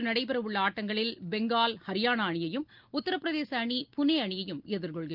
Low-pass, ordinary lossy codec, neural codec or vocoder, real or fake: 5.4 kHz; Opus, 32 kbps; none; real